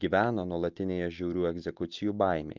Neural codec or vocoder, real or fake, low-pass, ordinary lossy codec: none; real; 7.2 kHz; Opus, 32 kbps